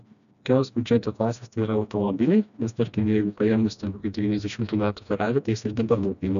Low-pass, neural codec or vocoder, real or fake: 7.2 kHz; codec, 16 kHz, 1 kbps, FreqCodec, smaller model; fake